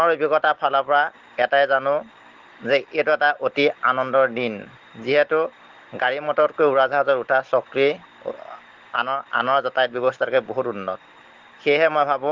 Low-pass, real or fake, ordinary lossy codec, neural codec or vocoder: 7.2 kHz; real; Opus, 16 kbps; none